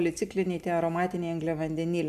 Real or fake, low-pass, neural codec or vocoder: real; 14.4 kHz; none